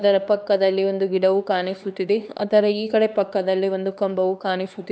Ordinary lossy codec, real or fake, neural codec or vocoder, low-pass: none; fake; codec, 16 kHz, 2 kbps, X-Codec, HuBERT features, trained on LibriSpeech; none